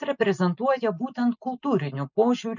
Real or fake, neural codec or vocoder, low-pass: real; none; 7.2 kHz